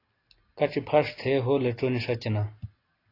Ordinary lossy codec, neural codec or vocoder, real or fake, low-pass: AAC, 24 kbps; none; real; 5.4 kHz